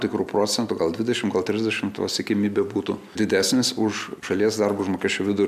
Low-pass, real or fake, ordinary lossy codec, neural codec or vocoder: 14.4 kHz; real; MP3, 96 kbps; none